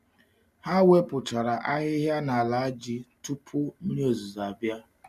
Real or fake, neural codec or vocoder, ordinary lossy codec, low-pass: real; none; none; 14.4 kHz